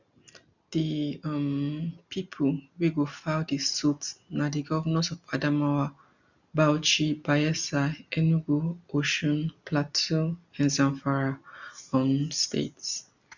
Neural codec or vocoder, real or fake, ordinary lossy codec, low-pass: none; real; none; 7.2 kHz